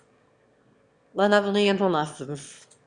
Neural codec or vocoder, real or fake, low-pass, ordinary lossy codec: autoencoder, 22.05 kHz, a latent of 192 numbers a frame, VITS, trained on one speaker; fake; 9.9 kHz; Opus, 64 kbps